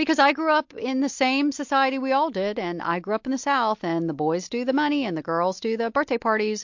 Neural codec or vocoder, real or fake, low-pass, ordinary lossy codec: none; real; 7.2 kHz; MP3, 48 kbps